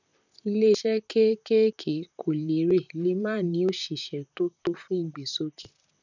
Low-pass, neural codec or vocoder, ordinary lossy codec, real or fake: 7.2 kHz; vocoder, 44.1 kHz, 128 mel bands, Pupu-Vocoder; none; fake